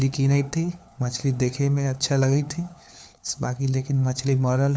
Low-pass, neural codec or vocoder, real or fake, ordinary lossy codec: none; codec, 16 kHz, 4 kbps, FunCodec, trained on LibriTTS, 50 frames a second; fake; none